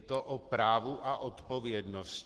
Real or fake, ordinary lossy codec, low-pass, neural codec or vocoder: fake; Opus, 16 kbps; 10.8 kHz; codec, 44.1 kHz, 7.8 kbps, Pupu-Codec